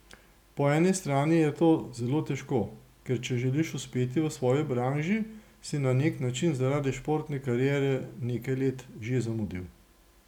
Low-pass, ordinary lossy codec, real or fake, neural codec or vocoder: 19.8 kHz; none; real; none